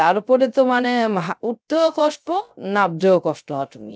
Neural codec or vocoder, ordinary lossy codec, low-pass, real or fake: codec, 16 kHz, 0.3 kbps, FocalCodec; none; none; fake